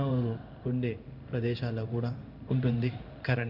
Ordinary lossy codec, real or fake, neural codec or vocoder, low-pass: none; fake; codec, 16 kHz in and 24 kHz out, 1 kbps, XY-Tokenizer; 5.4 kHz